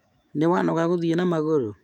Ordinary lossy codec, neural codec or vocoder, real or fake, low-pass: none; autoencoder, 48 kHz, 128 numbers a frame, DAC-VAE, trained on Japanese speech; fake; 19.8 kHz